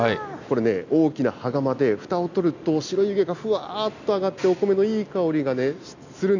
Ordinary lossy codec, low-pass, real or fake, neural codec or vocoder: none; 7.2 kHz; real; none